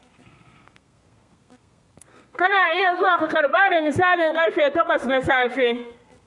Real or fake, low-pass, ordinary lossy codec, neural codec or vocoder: fake; 10.8 kHz; MP3, 64 kbps; codec, 32 kHz, 1.9 kbps, SNAC